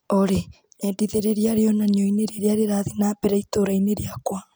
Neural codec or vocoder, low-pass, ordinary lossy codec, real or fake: none; none; none; real